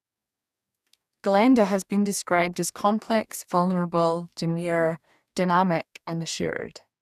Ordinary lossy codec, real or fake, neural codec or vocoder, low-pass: none; fake; codec, 44.1 kHz, 2.6 kbps, DAC; 14.4 kHz